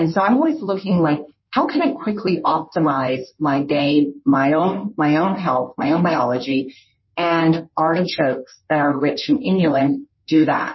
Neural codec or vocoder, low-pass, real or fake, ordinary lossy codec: codec, 16 kHz in and 24 kHz out, 1.1 kbps, FireRedTTS-2 codec; 7.2 kHz; fake; MP3, 24 kbps